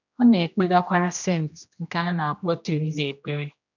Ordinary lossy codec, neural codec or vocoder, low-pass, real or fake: none; codec, 16 kHz, 1 kbps, X-Codec, HuBERT features, trained on general audio; 7.2 kHz; fake